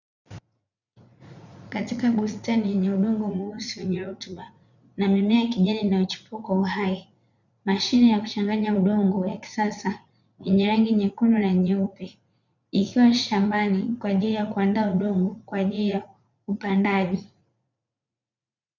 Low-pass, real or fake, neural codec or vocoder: 7.2 kHz; fake; vocoder, 44.1 kHz, 80 mel bands, Vocos